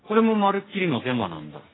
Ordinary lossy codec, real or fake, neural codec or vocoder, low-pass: AAC, 16 kbps; fake; codec, 44.1 kHz, 2.6 kbps, SNAC; 7.2 kHz